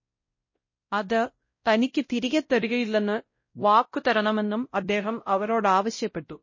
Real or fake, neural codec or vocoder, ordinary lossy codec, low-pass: fake; codec, 16 kHz, 0.5 kbps, X-Codec, WavLM features, trained on Multilingual LibriSpeech; MP3, 32 kbps; 7.2 kHz